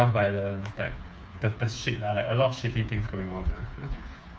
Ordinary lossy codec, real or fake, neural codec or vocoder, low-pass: none; fake; codec, 16 kHz, 4 kbps, FreqCodec, smaller model; none